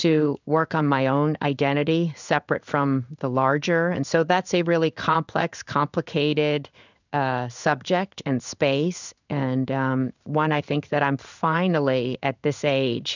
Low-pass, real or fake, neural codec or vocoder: 7.2 kHz; fake; codec, 16 kHz in and 24 kHz out, 1 kbps, XY-Tokenizer